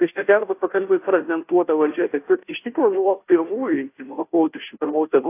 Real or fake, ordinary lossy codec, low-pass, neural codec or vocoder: fake; AAC, 24 kbps; 3.6 kHz; codec, 16 kHz, 0.5 kbps, FunCodec, trained on Chinese and English, 25 frames a second